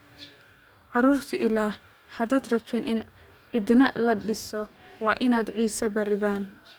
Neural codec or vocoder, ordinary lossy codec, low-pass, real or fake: codec, 44.1 kHz, 2.6 kbps, DAC; none; none; fake